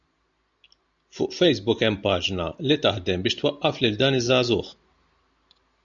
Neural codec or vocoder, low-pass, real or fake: none; 7.2 kHz; real